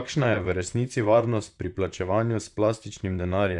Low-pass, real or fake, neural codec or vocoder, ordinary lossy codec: 10.8 kHz; fake; vocoder, 44.1 kHz, 128 mel bands, Pupu-Vocoder; none